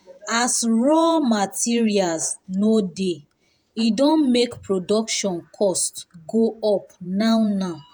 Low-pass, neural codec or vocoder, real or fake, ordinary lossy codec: none; vocoder, 48 kHz, 128 mel bands, Vocos; fake; none